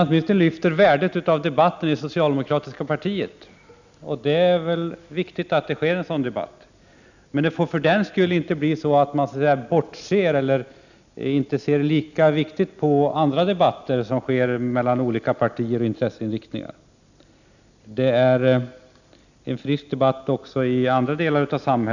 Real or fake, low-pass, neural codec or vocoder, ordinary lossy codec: real; 7.2 kHz; none; none